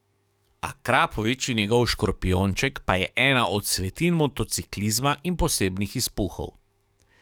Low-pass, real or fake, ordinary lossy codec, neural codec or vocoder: 19.8 kHz; fake; none; codec, 44.1 kHz, 7.8 kbps, DAC